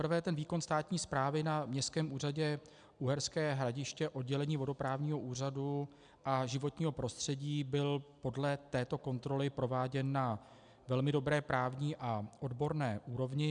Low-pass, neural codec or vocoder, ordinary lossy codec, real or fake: 9.9 kHz; none; MP3, 96 kbps; real